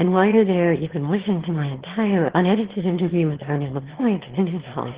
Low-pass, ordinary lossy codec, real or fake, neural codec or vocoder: 3.6 kHz; Opus, 16 kbps; fake; autoencoder, 22.05 kHz, a latent of 192 numbers a frame, VITS, trained on one speaker